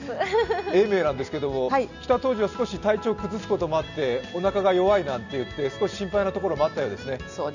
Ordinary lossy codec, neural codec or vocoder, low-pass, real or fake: none; none; 7.2 kHz; real